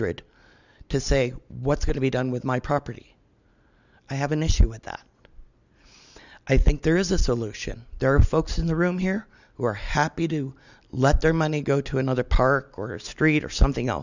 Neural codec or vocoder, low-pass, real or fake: none; 7.2 kHz; real